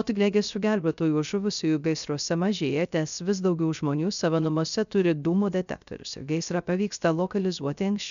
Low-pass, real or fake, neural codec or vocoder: 7.2 kHz; fake; codec, 16 kHz, 0.3 kbps, FocalCodec